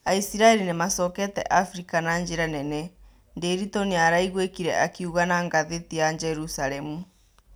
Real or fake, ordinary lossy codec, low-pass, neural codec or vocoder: real; none; none; none